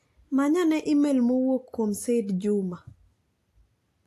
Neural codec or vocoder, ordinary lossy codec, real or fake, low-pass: none; AAC, 64 kbps; real; 14.4 kHz